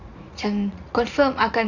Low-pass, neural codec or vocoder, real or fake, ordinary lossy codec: 7.2 kHz; vocoder, 44.1 kHz, 80 mel bands, Vocos; fake; none